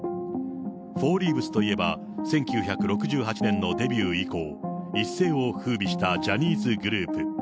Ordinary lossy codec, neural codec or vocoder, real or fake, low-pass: none; none; real; none